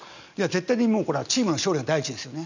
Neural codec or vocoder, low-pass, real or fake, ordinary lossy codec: none; 7.2 kHz; real; none